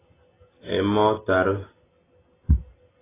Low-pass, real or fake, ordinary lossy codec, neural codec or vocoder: 3.6 kHz; real; AAC, 16 kbps; none